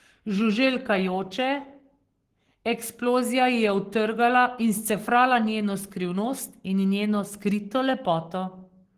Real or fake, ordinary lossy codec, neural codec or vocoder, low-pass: fake; Opus, 16 kbps; codec, 44.1 kHz, 7.8 kbps, Pupu-Codec; 14.4 kHz